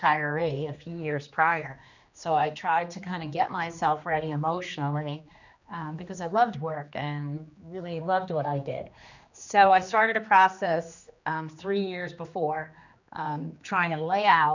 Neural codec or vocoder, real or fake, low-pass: codec, 16 kHz, 2 kbps, X-Codec, HuBERT features, trained on general audio; fake; 7.2 kHz